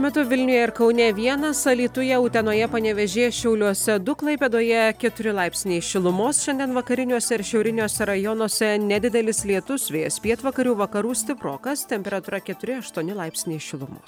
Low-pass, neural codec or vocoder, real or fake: 19.8 kHz; none; real